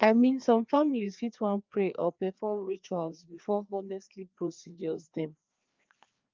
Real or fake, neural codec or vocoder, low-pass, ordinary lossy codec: fake; codec, 16 kHz, 2 kbps, FreqCodec, larger model; 7.2 kHz; Opus, 32 kbps